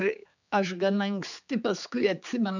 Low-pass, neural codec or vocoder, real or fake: 7.2 kHz; codec, 16 kHz, 4 kbps, X-Codec, HuBERT features, trained on general audio; fake